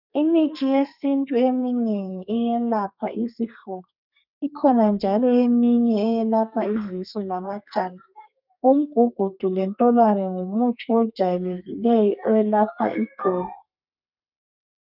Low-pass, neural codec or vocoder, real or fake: 5.4 kHz; codec, 32 kHz, 1.9 kbps, SNAC; fake